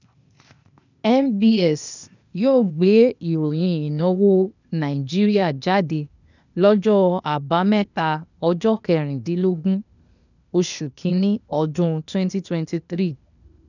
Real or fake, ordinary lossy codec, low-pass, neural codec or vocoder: fake; none; 7.2 kHz; codec, 16 kHz, 0.8 kbps, ZipCodec